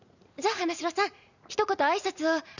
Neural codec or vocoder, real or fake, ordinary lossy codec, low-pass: vocoder, 44.1 kHz, 80 mel bands, Vocos; fake; none; 7.2 kHz